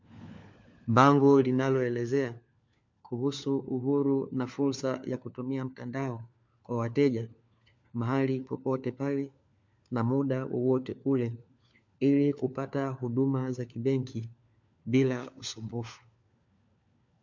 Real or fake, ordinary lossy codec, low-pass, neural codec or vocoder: fake; MP3, 64 kbps; 7.2 kHz; codec, 16 kHz, 4 kbps, FunCodec, trained on LibriTTS, 50 frames a second